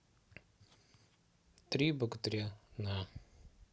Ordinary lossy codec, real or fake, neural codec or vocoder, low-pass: none; real; none; none